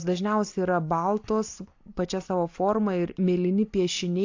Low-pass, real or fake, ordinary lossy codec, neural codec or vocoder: 7.2 kHz; real; AAC, 48 kbps; none